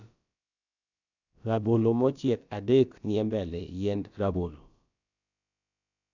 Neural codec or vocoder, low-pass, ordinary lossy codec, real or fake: codec, 16 kHz, about 1 kbps, DyCAST, with the encoder's durations; 7.2 kHz; none; fake